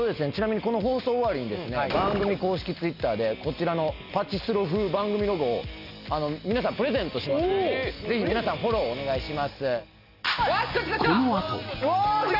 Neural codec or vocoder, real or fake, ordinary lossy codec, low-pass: none; real; none; 5.4 kHz